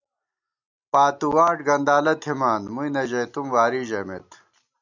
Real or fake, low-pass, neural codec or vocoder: real; 7.2 kHz; none